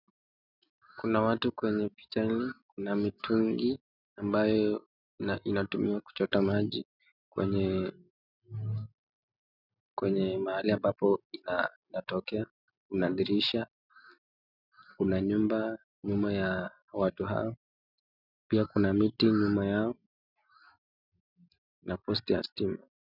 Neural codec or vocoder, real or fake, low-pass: none; real; 5.4 kHz